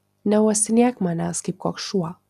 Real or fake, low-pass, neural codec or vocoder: real; 14.4 kHz; none